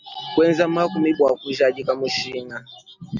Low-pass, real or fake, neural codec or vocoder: 7.2 kHz; real; none